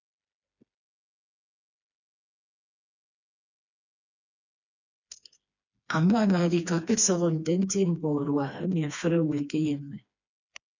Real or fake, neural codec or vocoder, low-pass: fake; codec, 16 kHz, 2 kbps, FreqCodec, smaller model; 7.2 kHz